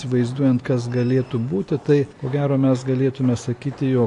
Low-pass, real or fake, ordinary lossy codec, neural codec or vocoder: 10.8 kHz; real; AAC, 48 kbps; none